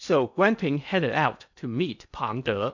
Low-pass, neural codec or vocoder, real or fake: 7.2 kHz; codec, 16 kHz in and 24 kHz out, 0.8 kbps, FocalCodec, streaming, 65536 codes; fake